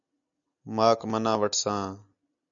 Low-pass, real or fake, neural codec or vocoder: 7.2 kHz; real; none